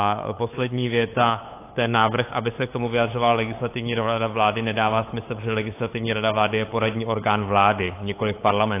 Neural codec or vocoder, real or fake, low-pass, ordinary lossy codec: codec, 16 kHz, 8 kbps, FunCodec, trained on LibriTTS, 25 frames a second; fake; 3.6 kHz; AAC, 24 kbps